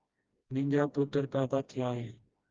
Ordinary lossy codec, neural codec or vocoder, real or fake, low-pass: Opus, 32 kbps; codec, 16 kHz, 1 kbps, FreqCodec, smaller model; fake; 7.2 kHz